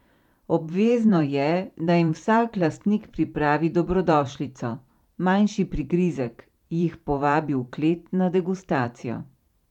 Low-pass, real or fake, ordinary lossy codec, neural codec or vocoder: 19.8 kHz; fake; none; vocoder, 44.1 kHz, 128 mel bands every 512 samples, BigVGAN v2